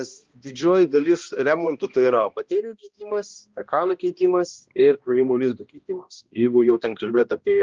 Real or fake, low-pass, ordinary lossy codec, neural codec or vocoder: fake; 7.2 kHz; Opus, 24 kbps; codec, 16 kHz, 1 kbps, X-Codec, HuBERT features, trained on balanced general audio